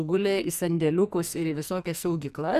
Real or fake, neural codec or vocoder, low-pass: fake; codec, 44.1 kHz, 2.6 kbps, SNAC; 14.4 kHz